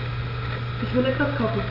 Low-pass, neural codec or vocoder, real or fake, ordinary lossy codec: 5.4 kHz; none; real; none